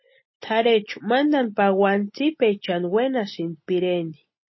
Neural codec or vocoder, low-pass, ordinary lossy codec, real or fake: none; 7.2 kHz; MP3, 24 kbps; real